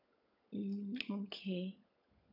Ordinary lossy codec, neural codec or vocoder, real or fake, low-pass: none; codec, 16 kHz, 16 kbps, FunCodec, trained on LibriTTS, 50 frames a second; fake; 5.4 kHz